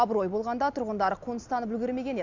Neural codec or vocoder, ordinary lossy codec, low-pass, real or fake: none; MP3, 64 kbps; 7.2 kHz; real